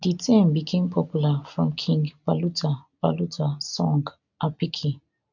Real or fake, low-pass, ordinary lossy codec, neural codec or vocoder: real; 7.2 kHz; none; none